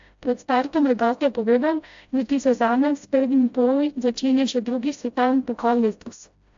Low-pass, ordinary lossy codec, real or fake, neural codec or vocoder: 7.2 kHz; AAC, 64 kbps; fake; codec, 16 kHz, 0.5 kbps, FreqCodec, smaller model